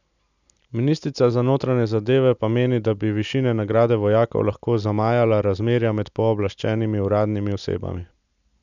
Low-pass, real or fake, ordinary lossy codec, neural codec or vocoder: 7.2 kHz; real; none; none